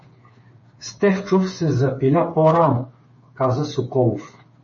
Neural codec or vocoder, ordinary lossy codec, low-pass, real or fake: codec, 16 kHz, 8 kbps, FreqCodec, smaller model; MP3, 32 kbps; 7.2 kHz; fake